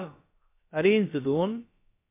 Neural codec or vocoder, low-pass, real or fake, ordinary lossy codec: codec, 16 kHz, about 1 kbps, DyCAST, with the encoder's durations; 3.6 kHz; fake; MP3, 24 kbps